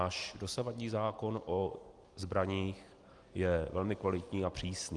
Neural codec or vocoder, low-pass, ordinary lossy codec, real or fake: none; 10.8 kHz; Opus, 24 kbps; real